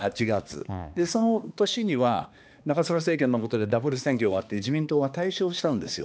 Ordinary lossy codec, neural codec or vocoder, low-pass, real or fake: none; codec, 16 kHz, 2 kbps, X-Codec, HuBERT features, trained on balanced general audio; none; fake